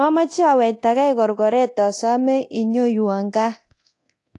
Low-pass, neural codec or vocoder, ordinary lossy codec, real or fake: 10.8 kHz; codec, 24 kHz, 0.9 kbps, DualCodec; AAC, 48 kbps; fake